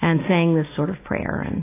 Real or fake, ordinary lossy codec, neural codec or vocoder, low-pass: real; AAC, 16 kbps; none; 3.6 kHz